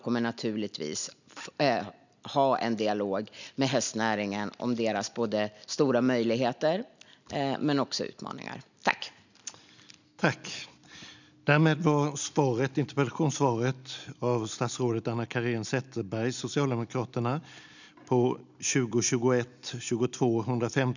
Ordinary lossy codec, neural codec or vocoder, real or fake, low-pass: none; none; real; 7.2 kHz